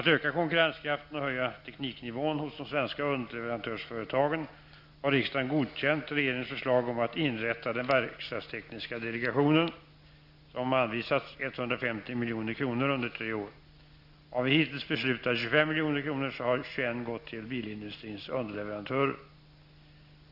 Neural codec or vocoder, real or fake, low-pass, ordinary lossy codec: none; real; 5.4 kHz; Opus, 64 kbps